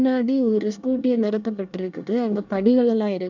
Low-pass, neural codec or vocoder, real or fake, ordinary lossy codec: 7.2 kHz; codec, 24 kHz, 1 kbps, SNAC; fake; none